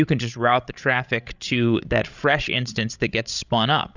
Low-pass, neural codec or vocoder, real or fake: 7.2 kHz; codec, 16 kHz, 16 kbps, FreqCodec, larger model; fake